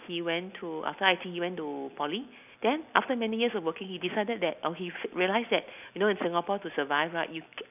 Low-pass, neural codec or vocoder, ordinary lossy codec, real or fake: 3.6 kHz; none; none; real